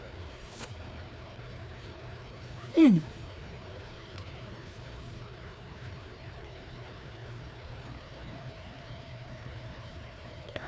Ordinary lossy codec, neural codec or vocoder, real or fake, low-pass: none; codec, 16 kHz, 2 kbps, FreqCodec, larger model; fake; none